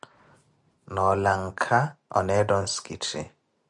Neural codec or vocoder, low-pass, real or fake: none; 10.8 kHz; real